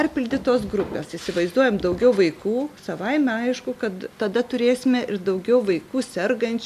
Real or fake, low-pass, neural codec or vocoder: real; 14.4 kHz; none